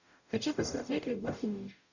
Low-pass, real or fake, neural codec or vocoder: 7.2 kHz; fake; codec, 44.1 kHz, 0.9 kbps, DAC